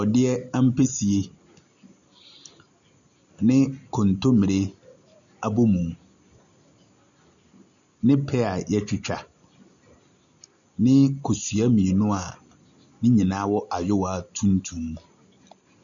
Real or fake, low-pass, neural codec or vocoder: real; 7.2 kHz; none